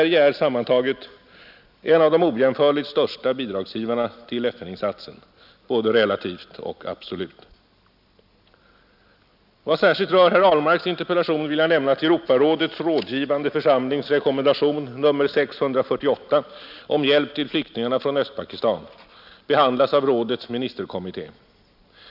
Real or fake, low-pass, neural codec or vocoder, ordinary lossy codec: real; 5.4 kHz; none; none